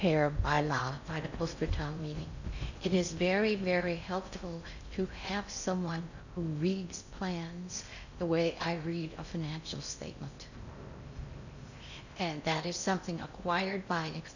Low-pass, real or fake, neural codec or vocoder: 7.2 kHz; fake; codec, 16 kHz in and 24 kHz out, 0.6 kbps, FocalCodec, streaming, 4096 codes